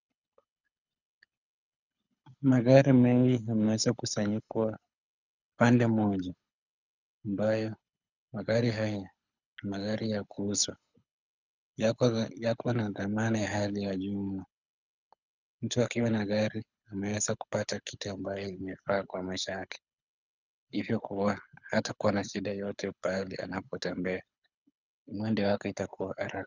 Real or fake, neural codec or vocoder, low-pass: fake; codec, 24 kHz, 6 kbps, HILCodec; 7.2 kHz